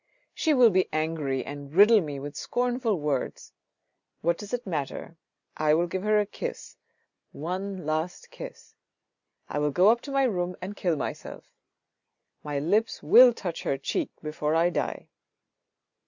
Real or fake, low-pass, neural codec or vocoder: real; 7.2 kHz; none